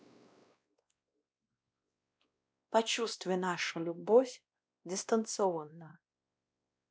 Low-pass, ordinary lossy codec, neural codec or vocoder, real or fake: none; none; codec, 16 kHz, 1 kbps, X-Codec, WavLM features, trained on Multilingual LibriSpeech; fake